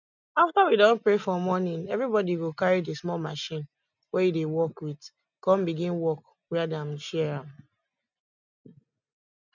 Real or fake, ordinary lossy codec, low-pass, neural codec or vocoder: real; none; 7.2 kHz; none